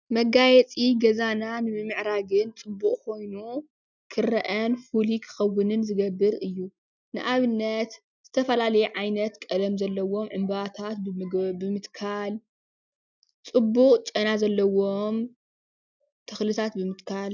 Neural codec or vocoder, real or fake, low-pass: none; real; 7.2 kHz